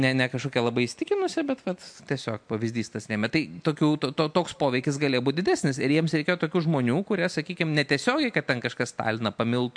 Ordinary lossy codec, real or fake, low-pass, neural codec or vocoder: MP3, 64 kbps; real; 9.9 kHz; none